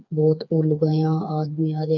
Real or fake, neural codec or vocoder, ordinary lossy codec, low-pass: fake; codec, 16 kHz, 4 kbps, FreqCodec, smaller model; none; 7.2 kHz